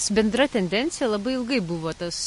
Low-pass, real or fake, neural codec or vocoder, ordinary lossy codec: 14.4 kHz; real; none; MP3, 48 kbps